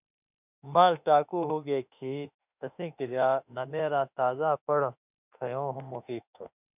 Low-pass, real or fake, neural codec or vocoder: 3.6 kHz; fake; autoencoder, 48 kHz, 32 numbers a frame, DAC-VAE, trained on Japanese speech